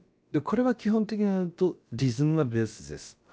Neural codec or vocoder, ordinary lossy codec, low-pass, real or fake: codec, 16 kHz, about 1 kbps, DyCAST, with the encoder's durations; none; none; fake